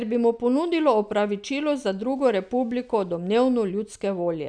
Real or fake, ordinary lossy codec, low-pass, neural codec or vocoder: real; none; 9.9 kHz; none